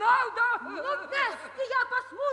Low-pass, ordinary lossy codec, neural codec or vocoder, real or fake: 10.8 kHz; AAC, 64 kbps; none; real